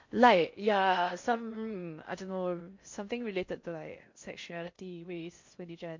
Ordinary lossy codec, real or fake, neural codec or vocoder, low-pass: MP3, 48 kbps; fake; codec, 16 kHz in and 24 kHz out, 0.6 kbps, FocalCodec, streaming, 4096 codes; 7.2 kHz